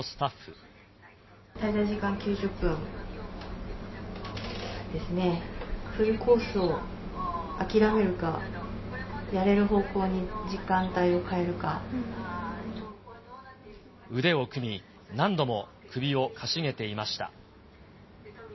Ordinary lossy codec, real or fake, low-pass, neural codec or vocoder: MP3, 24 kbps; real; 7.2 kHz; none